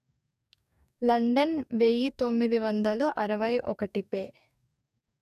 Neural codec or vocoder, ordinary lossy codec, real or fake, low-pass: codec, 44.1 kHz, 2.6 kbps, DAC; none; fake; 14.4 kHz